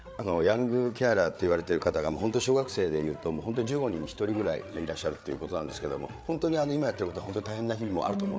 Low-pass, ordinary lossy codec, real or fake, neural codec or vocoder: none; none; fake; codec, 16 kHz, 8 kbps, FreqCodec, larger model